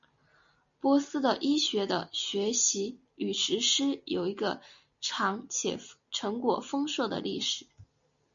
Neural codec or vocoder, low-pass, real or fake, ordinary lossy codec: none; 7.2 kHz; real; MP3, 64 kbps